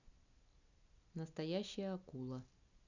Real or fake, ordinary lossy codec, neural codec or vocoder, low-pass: real; none; none; 7.2 kHz